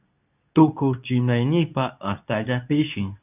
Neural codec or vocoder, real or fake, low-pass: codec, 24 kHz, 0.9 kbps, WavTokenizer, medium speech release version 2; fake; 3.6 kHz